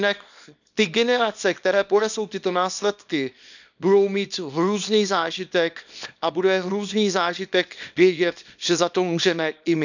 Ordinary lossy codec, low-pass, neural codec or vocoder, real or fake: none; 7.2 kHz; codec, 24 kHz, 0.9 kbps, WavTokenizer, small release; fake